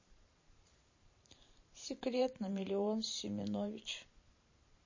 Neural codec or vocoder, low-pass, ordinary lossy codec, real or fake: vocoder, 44.1 kHz, 128 mel bands every 512 samples, BigVGAN v2; 7.2 kHz; MP3, 32 kbps; fake